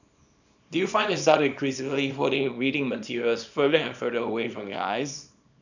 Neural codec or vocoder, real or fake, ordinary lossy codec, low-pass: codec, 24 kHz, 0.9 kbps, WavTokenizer, small release; fake; none; 7.2 kHz